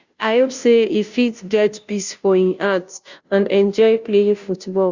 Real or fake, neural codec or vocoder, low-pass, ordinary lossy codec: fake; codec, 16 kHz, 0.5 kbps, FunCodec, trained on Chinese and English, 25 frames a second; 7.2 kHz; Opus, 64 kbps